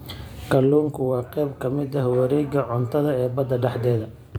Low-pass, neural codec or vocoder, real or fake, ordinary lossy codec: none; vocoder, 44.1 kHz, 128 mel bands every 512 samples, BigVGAN v2; fake; none